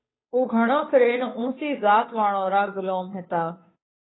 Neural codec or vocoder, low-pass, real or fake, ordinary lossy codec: codec, 16 kHz, 2 kbps, FunCodec, trained on Chinese and English, 25 frames a second; 7.2 kHz; fake; AAC, 16 kbps